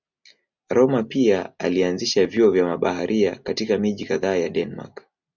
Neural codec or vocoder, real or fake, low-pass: none; real; 7.2 kHz